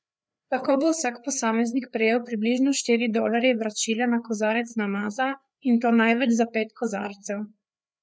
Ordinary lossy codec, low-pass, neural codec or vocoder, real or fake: none; none; codec, 16 kHz, 4 kbps, FreqCodec, larger model; fake